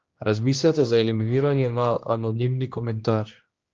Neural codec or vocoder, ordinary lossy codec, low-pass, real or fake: codec, 16 kHz, 1 kbps, X-Codec, HuBERT features, trained on general audio; Opus, 24 kbps; 7.2 kHz; fake